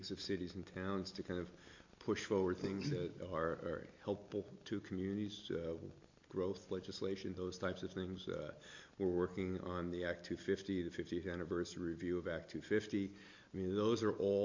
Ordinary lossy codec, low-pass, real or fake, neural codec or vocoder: MP3, 48 kbps; 7.2 kHz; real; none